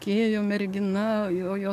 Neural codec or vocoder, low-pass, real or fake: codec, 44.1 kHz, 7.8 kbps, DAC; 14.4 kHz; fake